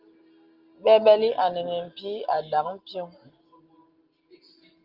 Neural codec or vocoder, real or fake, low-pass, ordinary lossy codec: none; real; 5.4 kHz; Opus, 32 kbps